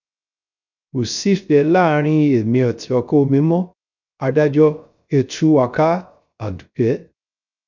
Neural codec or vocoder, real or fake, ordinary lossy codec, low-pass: codec, 16 kHz, 0.3 kbps, FocalCodec; fake; none; 7.2 kHz